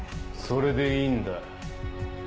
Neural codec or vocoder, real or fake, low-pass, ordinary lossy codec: none; real; none; none